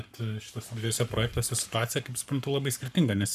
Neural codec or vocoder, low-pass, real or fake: codec, 44.1 kHz, 7.8 kbps, Pupu-Codec; 14.4 kHz; fake